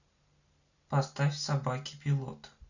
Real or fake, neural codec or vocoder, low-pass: real; none; 7.2 kHz